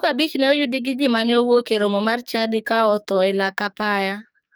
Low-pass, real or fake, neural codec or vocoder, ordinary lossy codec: none; fake; codec, 44.1 kHz, 2.6 kbps, SNAC; none